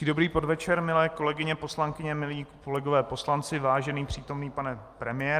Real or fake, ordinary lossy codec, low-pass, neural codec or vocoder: real; Opus, 32 kbps; 14.4 kHz; none